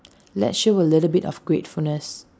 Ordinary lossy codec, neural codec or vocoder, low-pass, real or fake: none; none; none; real